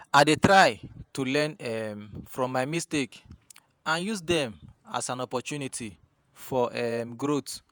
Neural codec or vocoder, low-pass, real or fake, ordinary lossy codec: vocoder, 48 kHz, 128 mel bands, Vocos; none; fake; none